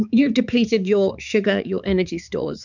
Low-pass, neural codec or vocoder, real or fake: 7.2 kHz; codec, 16 kHz, 4 kbps, X-Codec, HuBERT features, trained on balanced general audio; fake